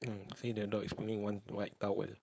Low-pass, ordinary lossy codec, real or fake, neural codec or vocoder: none; none; fake; codec, 16 kHz, 4.8 kbps, FACodec